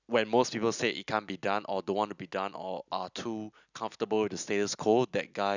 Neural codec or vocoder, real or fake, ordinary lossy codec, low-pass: none; real; none; 7.2 kHz